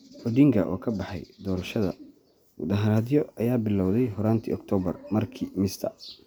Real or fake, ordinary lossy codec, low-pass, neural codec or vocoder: real; none; none; none